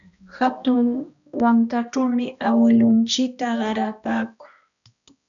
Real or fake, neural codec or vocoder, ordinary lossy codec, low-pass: fake; codec, 16 kHz, 1 kbps, X-Codec, HuBERT features, trained on balanced general audio; MP3, 96 kbps; 7.2 kHz